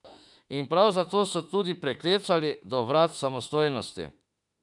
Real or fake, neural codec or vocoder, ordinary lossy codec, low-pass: fake; autoencoder, 48 kHz, 32 numbers a frame, DAC-VAE, trained on Japanese speech; none; 10.8 kHz